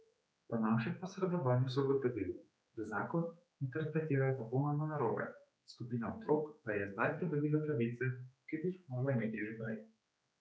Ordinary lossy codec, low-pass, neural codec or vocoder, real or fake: none; none; codec, 16 kHz, 4 kbps, X-Codec, HuBERT features, trained on general audio; fake